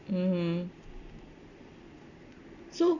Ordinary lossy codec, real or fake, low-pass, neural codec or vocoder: none; real; 7.2 kHz; none